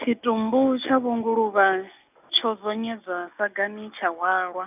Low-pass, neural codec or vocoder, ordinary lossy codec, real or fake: 3.6 kHz; none; none; real